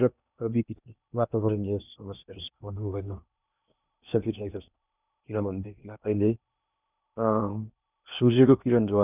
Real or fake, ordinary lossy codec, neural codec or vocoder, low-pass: fake; none; codec, 16 kHz in and 24 kHz out, 0.8 kbps, FocalCodec, streaming, 65536 codes; 3.6 kHz